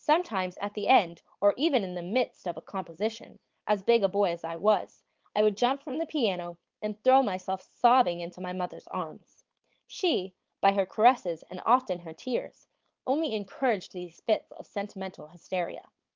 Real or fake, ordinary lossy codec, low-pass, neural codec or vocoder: fake; Opus, 32 kbps; 7.2 kHz; codec, 16 kHz, 4.8 kbps, FACodec